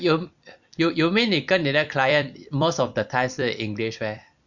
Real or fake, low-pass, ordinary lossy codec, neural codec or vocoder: fake; 7.2 kHz; none; vocoder, 44.1 kHz, 128 mel bands every 256 samples, BigVGAN v2